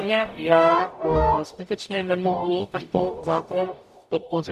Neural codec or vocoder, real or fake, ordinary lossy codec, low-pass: codec, 44.1 kHz, 0.9 kbps, DAC; fake; AAC, 96 kbps; 14.4 kHz